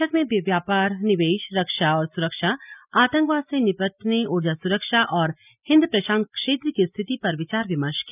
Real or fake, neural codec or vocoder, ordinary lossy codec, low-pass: real; none; none; 3.6 kHz